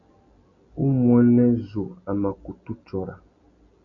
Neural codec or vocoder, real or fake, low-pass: none; real; 7.2 kHz